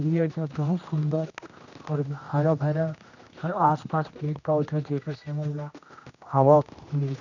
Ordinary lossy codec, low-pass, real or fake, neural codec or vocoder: none; 7.2 kHz; fake; codec, 16 kHz, 1 kbps, X-Codec, HuBERT features, trained on general audio